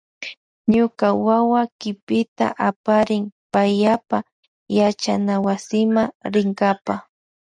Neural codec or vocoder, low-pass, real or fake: none; 9.9 kHz; real